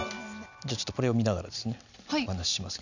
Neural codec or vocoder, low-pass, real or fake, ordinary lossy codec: none; 7.2 kHz; real; none